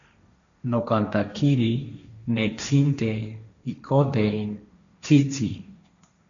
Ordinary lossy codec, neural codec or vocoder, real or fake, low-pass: MP3, 96 kbps; codec, 16 kHz, 1.1 kbps, Voila-Tokenizer; fake; 7.2 kHz